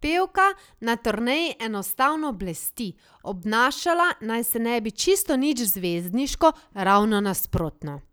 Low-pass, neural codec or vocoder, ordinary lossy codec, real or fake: none; none; none; real